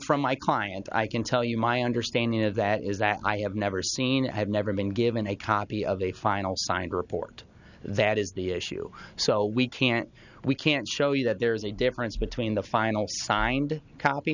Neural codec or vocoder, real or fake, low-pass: none; real; 7.2 kHz